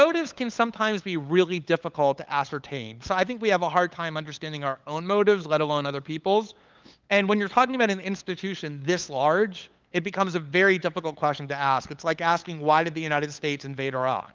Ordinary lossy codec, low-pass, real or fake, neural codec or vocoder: Opus, 24 kbps; 7.2 kHz; fake; codec, 16 kHz, 8 kbps, FunCodec, trained on LibriTTS, 25 frames a second